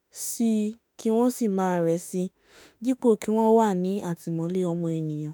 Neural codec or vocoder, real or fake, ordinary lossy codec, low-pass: autoencoder, 48 kHz, 32 numbers a frame, DAC-VAE, trained on Japanese speech; fake; none; none